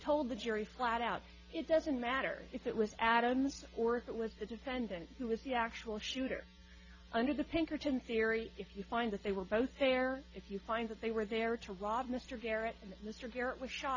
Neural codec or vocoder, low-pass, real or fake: none; 7.2 kHz; real